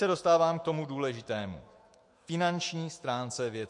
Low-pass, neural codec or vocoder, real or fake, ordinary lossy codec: 10.8 kHz; autoencoder, 48 kHz, 128 numbers a frame, DAC-VAE, trained on Japanese speech; fake; MP3, 48 kbps